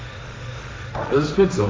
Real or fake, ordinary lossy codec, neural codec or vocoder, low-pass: fake; none; codec, 16 kHz, 1.1 kbps, Voila-Tokenizer; none